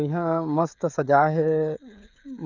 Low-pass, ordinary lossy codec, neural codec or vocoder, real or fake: 7.2 kHz; none; codec, 16 kHz, 16 kbps, FreqCodec, smaller model; fake